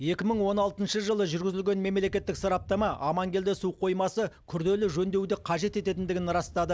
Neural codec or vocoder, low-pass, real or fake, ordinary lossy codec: none; none; real; none